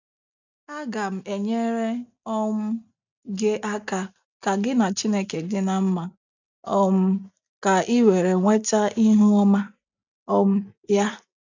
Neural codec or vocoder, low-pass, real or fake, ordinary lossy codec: none; 7.2 kHz; real; none